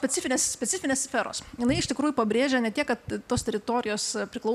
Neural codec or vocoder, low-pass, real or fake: none; 14.4 kHz; real